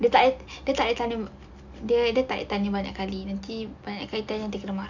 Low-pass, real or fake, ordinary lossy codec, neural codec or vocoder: 7.2 kHz; real; none; none